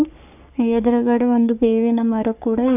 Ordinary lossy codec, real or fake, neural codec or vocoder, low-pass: none; fake; codec, 44.1 kHz, 3.4 kbps, Pupu-Codec; 3.6 kHz